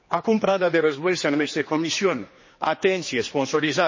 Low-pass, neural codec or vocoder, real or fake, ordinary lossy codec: 7.2 kHz; codec, 16 kHz, 2 kbps, X-Codec, HuBERT features, trained on general audio; fake; MP3, 32 kbps